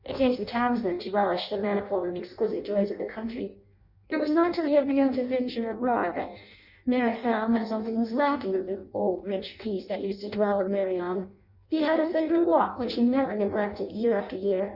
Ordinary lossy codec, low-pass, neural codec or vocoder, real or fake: Opus, 64 kbps; 5.4 kHz; codec, 16 kHz in and 24 kHz out, 0.6 kbps, FireRedTTS-2 codec; fake